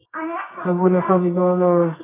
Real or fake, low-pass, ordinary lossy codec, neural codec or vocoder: fake; 3.6 kHz; AAC, 16 kbps; codec, 24 kHz, 0.9 kbps, WavTokenizer, medium music audio release